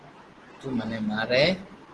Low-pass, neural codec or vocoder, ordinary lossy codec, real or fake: 10.8 kHz; none; Opus, 16 kbps; real